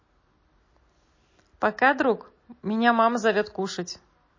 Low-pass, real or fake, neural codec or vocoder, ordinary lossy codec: 7.2 kHz; real; none; MP3, 32 kbps